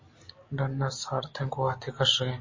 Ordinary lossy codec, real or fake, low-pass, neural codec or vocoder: MP3, 32 kbps; real; 7.2 kHz; none